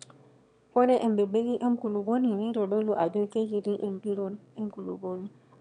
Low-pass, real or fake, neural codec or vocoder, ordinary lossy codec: 9.9 kHz; fake; autoencoder, 22.05 kHz, a latent of 192 numbers a frame, VITS, trained on one speaker; none